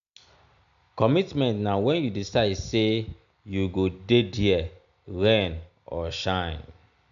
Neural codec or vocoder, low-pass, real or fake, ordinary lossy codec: none; 7.2 kHz; real; AAC, 96 kbps